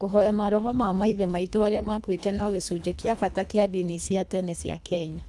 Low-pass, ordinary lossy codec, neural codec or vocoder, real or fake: none; none; codec, 24 kHz, 1.5 kbps, HILCodec; fake